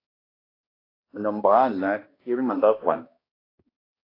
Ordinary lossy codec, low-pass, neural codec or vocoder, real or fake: AAC, 24 kbps; 5.4 kHz; codec, 16 kHz, 1 kbps, X-Codec, HuBERT features, trained on balanced general audio; fake